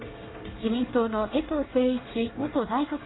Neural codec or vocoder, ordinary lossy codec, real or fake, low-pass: codec, 24 kHz, 1 kbps, SNAC; AAC, 16 kbps; fake; 7.2 kHz